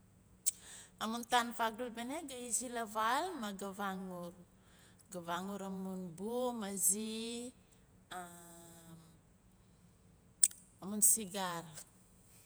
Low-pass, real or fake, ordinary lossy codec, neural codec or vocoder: none; fake; none; vocoder, 48 kHz, 128 mel bands, Vocos